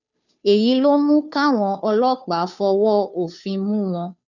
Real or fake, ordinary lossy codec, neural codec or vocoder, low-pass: fake; none; codec, 16 kHz, 2 kbps, FunCodec, trained on Chinese and English, 25 frames a second; 7.2 kHz